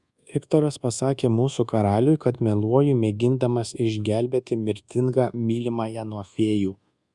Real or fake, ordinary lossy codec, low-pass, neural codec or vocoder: fake; Opus, 64 kbps; 10.8 kHz; codec, 24 kHz, 1.2 kbps, DualCodec